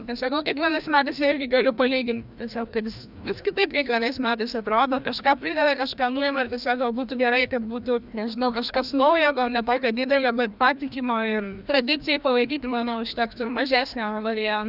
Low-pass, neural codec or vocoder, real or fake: 5.4 kHz; codec, 16 kHz, 1 kbps, FreqCodec, larger model; fake